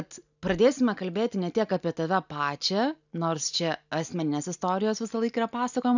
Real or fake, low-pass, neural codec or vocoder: real; 7.2 kHz; none